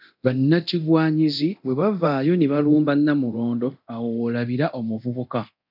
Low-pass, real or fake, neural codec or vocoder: 5.4 kHz; fake; codec, 24 kHz, 0.9 kbps, DualCodec